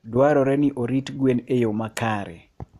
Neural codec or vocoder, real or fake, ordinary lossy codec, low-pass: vocoder, 44.1 kHz, 128 mel bands every 256 samples, BigVGAN v2; fake; AAC, 64 kbps; 14.4 kHz